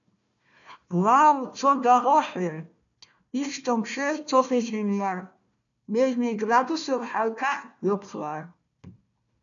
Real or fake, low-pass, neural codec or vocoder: fake; 7.2 kHz; codec, 16 kHz, 1 kbps, FunCodec, trained on Chinese and English, 50 frames a second